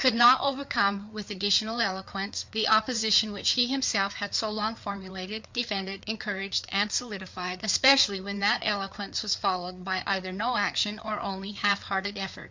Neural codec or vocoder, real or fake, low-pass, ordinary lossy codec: codec, 16 kHz, 4 kbps, FreqCodec, larger model; fake; 7.2 kHz; MP3, 64 kbps